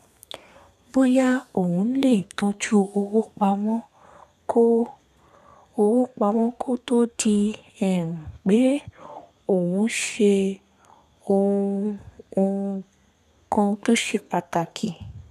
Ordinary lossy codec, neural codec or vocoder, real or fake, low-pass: none; codec, 32 kHz, 1.9 kbps, SNAC; fake; 14.4 kHz